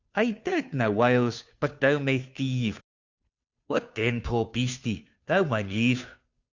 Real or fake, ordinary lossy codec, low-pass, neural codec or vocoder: fake; Opus, 64 kbps; 7.2 kHz; codec, 16 kHz, 2 kbps, FunCodec, trained on Chinese and English, 25 frames a second